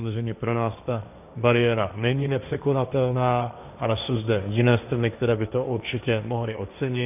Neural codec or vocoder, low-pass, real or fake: codec, 16 kHz, 1.1 kbps, Voila-Tokenizer; 3.6 kHz; fake